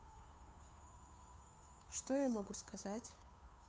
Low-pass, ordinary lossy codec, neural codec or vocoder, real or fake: none; none; codec, 16 kHz, 2 kbps, FunCodec, trained on Chinese and English, 25 frames a second; fake